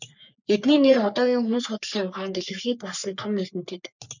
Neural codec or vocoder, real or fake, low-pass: codec, 44.1 kHz, 3.4 kbps, Pupu-Codec; fake; 7.2 kHz